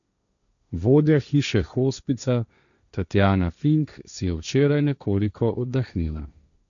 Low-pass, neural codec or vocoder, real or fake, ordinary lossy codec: 7.2 kHz; codec, 16 kHz, 1.1 kbps, Voila-Tokenizer; fake; none